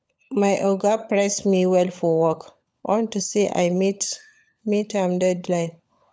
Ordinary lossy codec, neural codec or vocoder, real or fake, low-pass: none; codec, 16 kHz, 16 kbps, FunCodec, trained on LibriTTS, 50 frames a second; fake; none